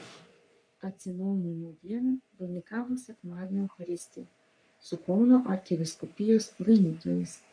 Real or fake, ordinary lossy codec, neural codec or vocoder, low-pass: fake; MP3, 48 kbps; codec, 44.1 kHz, 3.4 kbps, Pupu-Codec; 9.9 kHz